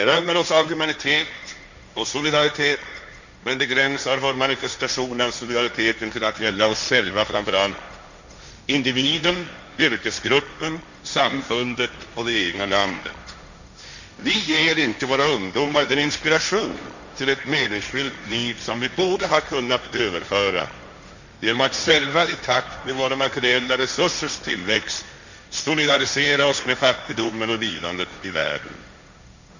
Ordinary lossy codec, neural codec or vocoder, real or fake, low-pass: none; codec, 16 kHz, 1.1 kbps, Voila-Tokenizer; fake; 7.2 kHz